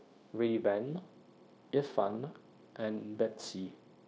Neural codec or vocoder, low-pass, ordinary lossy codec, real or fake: codec, 16 kHz, 0.9 kbps, LongCat-Audio-Codec; none; none; fake